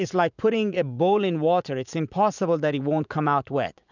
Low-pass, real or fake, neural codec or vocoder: 7.2 kHz; fake; vocoder, 44.1 kHz, 80 mel bands, Vocos